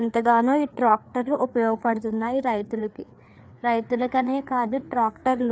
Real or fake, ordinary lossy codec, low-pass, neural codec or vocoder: fake; none; none; codec, 16 kHz, 4 kbps, FreqCodec, larger model